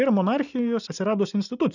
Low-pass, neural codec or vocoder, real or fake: 7.2 kHz; none; real